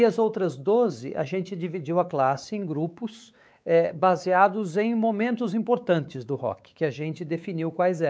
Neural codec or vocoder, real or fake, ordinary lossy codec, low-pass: codec, 16 kHz, 4 kbps, X-Codec, WavLM features, trained on Multilingual LibriSpeech; fake; none; none